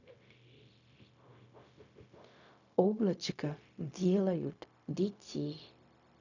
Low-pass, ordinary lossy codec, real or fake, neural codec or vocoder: 7.2 kHz; none; fake; codec, 16 kHz, 0.4 kbps, LongCat-Audio-Codec